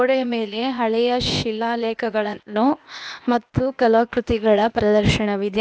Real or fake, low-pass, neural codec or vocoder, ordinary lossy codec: fake; none; codec, 16 kHz, 0.8 kbps, ZipCodec; none